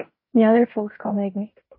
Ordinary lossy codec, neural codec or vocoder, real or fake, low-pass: MP3, 24 kbps; codec, 16 kHz in and 24 kHz out, 0.9 kbps, LongCat-Audio-Codec, fine tuned four codebook decoder; fake; 5.4 kHz